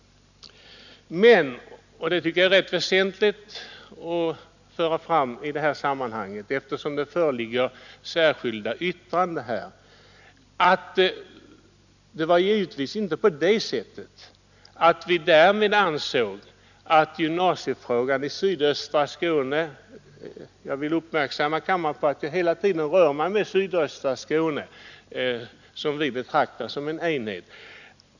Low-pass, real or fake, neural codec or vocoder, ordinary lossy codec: 7.2 kHz; real; none; none